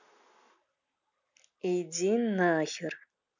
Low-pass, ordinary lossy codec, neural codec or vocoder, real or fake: 7.2 kHz; none; none; real